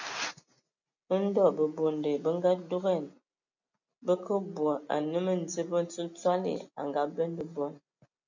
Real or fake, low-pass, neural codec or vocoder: real; 7.2 kHz; none